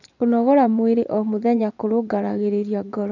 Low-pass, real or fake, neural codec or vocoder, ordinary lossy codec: 7.2 kHz; fake; vocoder, 24 kHz, 100 mel bands, Vocos; none